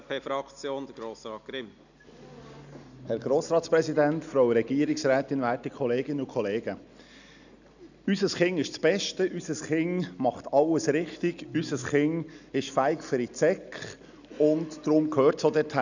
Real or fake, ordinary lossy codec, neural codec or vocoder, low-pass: real; none; none; 7.2 kHz